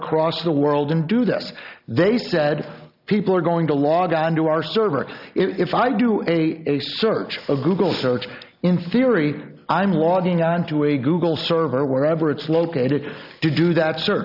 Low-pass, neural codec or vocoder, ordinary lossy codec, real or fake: 5.4 kHz; none; AAC, 48 kbps; real